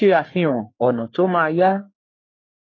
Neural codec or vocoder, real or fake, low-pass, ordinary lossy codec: codec, 44.1 kHz, 3.4 kbps, Pupu-Codec; fake; 7.2 kHz; AAC, 32 kbps